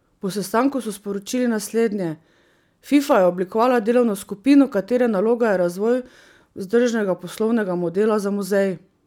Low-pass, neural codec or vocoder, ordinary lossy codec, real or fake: 19.8 kHz; none; none; real